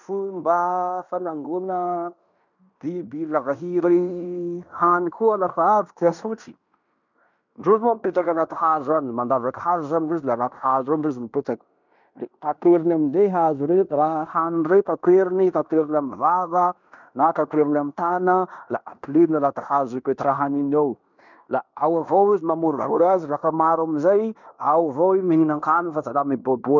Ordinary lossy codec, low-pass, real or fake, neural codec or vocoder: none; 7.2 kHz; fake; codec, 16 kHz in and 24 kHz out, 0.9 kbps, LongCat-Audio-Codec, fine tuned four codebook decoder